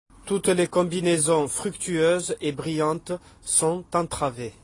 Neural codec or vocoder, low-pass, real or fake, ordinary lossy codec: none; 10.8 kHz; real; AAC, 32 kbps